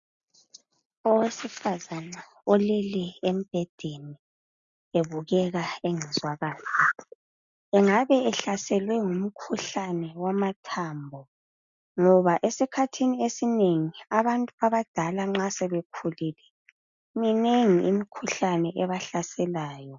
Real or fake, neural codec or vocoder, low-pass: real; none; 7.2 kHz